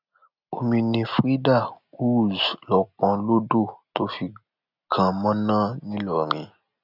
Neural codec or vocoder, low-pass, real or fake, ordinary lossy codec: none; 5.4 kHz; real; none